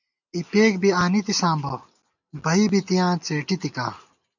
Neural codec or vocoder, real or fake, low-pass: none; real; 7.2 kHz